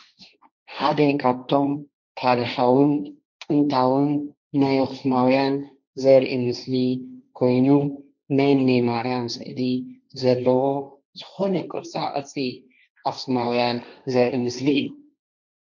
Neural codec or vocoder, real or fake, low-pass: codec, 16 kHz, 1.1 kbps, Voila-Tokenizer; fake; 7.2 kHz